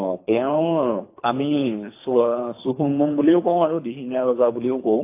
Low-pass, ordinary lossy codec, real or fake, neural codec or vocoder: 3.6 kHz; AAC, 24 kbps; fake; codec, 24 kHz, 3 kbps, HILCodec